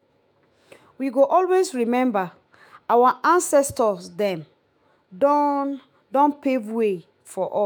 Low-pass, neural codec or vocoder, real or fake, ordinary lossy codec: none; autoencoder, 48 kHz, 128 numbers a frame, DAC-VAE, trained on Japanese speech; fake; none